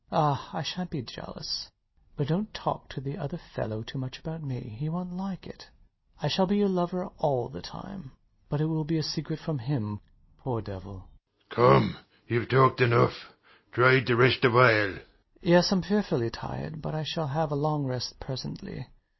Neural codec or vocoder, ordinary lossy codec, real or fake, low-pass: none; MP3, 24 kbps; real; 7.2 kHz